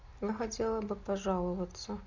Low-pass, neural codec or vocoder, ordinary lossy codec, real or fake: 7.2 kHz; none; AAC, 48 kbps; real